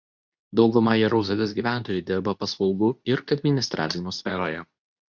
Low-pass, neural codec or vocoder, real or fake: 7.2 kHz; codec, 24 kHz, 0.9 kbps, WavTokenizer, medium speech release version 2; fake